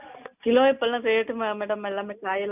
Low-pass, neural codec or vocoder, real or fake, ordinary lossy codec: 3.6 kHz; none; real; none